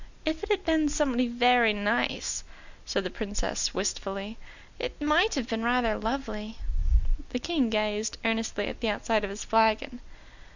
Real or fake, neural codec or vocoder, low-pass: real; none; 7.2 kHz